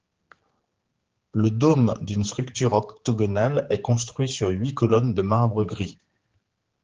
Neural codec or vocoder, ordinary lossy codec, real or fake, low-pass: codec, 16 kHz, 4 kbps, X-Codec, HuBERT features, trained on general audio; Opus, 16 kbps; fake; 7.2 kHz